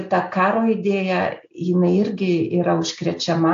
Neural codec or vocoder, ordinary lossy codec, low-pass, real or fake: none; AAC, 48 kbps; 7.2 kHz; real